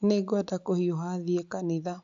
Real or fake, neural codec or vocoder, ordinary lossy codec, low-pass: real; none; AAC, 64 kbps; 7.2 kHz